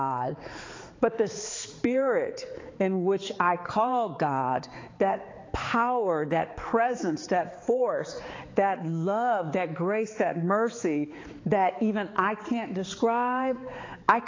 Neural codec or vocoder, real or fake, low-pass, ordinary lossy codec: codec, 16 kHz, 4 kbps, X-Codec, HuBERT features, trained on balanced general audio; fake; 7.2 kHz; AAC, 32 kbps